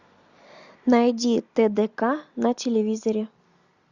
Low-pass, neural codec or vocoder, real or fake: 7.2 kHz; none; real